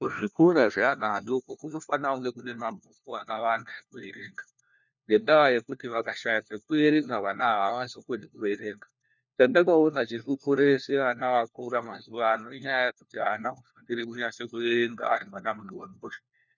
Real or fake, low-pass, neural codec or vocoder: fake; 7.2 kHz; codec, 16 kHz, 1 kbps, FunCodec, trained on LibriTTS, 50 frames a second